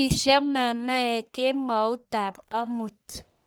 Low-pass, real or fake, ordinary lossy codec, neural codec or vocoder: none; fake; none; codec, 44.1 kHz, 1.7 kbps, Pupu-Codec